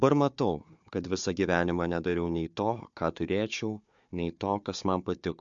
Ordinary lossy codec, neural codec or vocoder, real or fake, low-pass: MP3, 64 kbps; codec, 16 kHz, 4 kbps, FunCodec, trained on Chinese and English, 50 frames a second; fake; 7.2 kHz